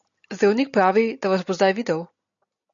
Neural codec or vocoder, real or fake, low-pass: none; real; 7.2 kHz